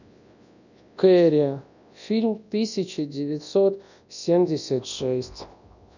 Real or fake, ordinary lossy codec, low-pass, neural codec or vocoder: fake; none; 7.2 kHz; codec, 24 kHz, 0.9 kbps, WavTokenizer, large speech release